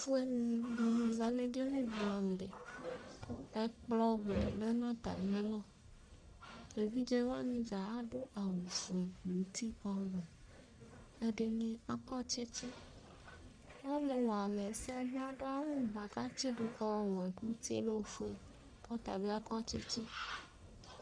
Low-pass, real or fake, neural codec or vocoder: 9.9 kHz; fake; codec, 44.1 kHz, 1.7 kbps, Pupu-Codec